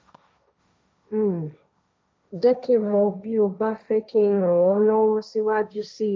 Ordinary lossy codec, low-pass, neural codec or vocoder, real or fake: none; none; codec, 16 kHz, 1.1 kbps, Voila-Tokenizer; fake